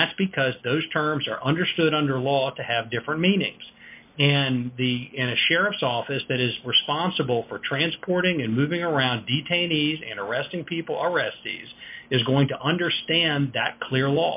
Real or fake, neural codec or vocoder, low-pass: real; none; 3.6 kHz